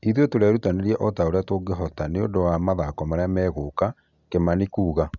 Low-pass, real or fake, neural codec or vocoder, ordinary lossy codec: 7.2 kHz; real; none; none